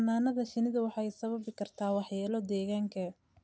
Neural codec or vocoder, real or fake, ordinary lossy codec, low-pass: none; real; none; none